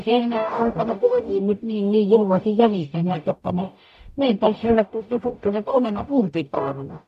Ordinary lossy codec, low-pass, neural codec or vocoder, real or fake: none; 14.4 kHz; codec, 44.1 kHz, 0.9 kbps, DAC; fake